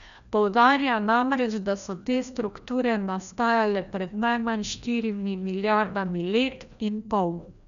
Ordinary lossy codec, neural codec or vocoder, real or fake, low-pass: none; codec, 16 kHz, 1 kbps, FreqCodec, larger model; fake; 7.2 kHz